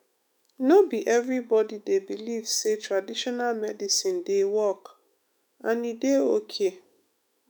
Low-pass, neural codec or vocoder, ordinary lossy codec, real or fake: none; autoencoder, 48 kHz, 128 numbers a frame, DAC-VAE, trained on Japanese speech; none; fake